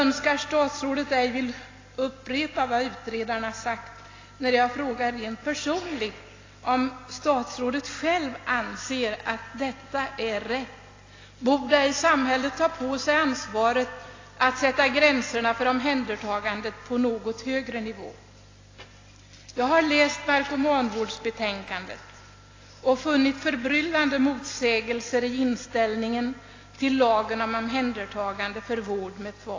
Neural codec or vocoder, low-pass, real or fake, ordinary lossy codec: none; 7.2 kHz; real; AAC, 32 kbps